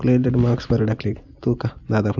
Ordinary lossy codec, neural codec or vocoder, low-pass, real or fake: none; none; 7.2 kHz; real